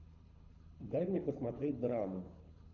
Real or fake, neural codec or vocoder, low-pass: fake; codec, 24 kHz, 6 kbps, HILCodec; 7.2 kHz